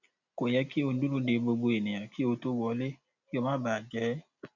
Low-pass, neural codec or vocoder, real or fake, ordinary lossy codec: 7.2 kHz; none; real; none